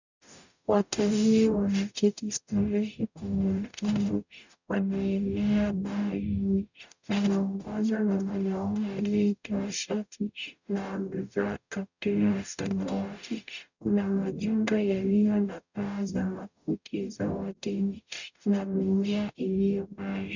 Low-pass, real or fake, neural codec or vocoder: 7.2 kHz; fake; codec, 44.1 kHz, 0.9 kbps, DAC